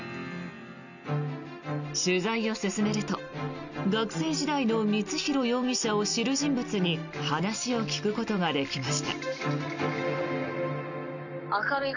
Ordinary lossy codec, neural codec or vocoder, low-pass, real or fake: none; none; 7.2 kHz; real